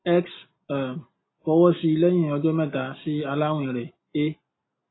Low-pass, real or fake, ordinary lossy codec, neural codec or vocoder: 7.2 kHz; real; AAC, 16 kbps; none